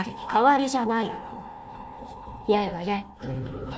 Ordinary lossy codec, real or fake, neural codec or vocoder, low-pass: none; fake; codec, 16 kHz, 1 kbps, FunCodec, trained on Chinese and English, 50 frames a second; none